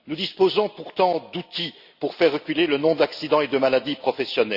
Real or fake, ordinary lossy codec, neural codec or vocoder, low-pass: real; Opus, 64 kbps; none; 5.4 kHz